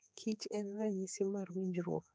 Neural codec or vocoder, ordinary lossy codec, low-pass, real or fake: codec, 16 kHz, 4 kbps, X-Codec, HuBERT features, trained on general audio; none; none; fake